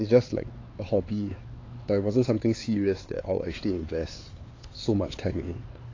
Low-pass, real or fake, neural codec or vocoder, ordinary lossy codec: 7.2 kHz; fake; codec, 16 kHz, 4 kbps, X-Codec, HuBERT features, trained on LibriSpeech; AAC, 32 kbps